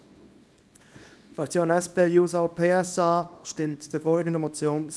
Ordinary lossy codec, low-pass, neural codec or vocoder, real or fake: none; none; codec, 24 kHz, 0.9 kbps, WavTokenizer, small release; fake